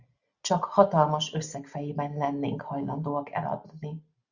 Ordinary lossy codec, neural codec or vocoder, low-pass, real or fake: Opus, 64 kbps; none; 7.2 kHz; real